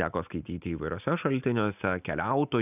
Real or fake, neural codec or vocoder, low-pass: real; none; 3.6 kHz